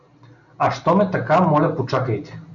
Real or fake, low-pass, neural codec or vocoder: real; 7.2 kHz; none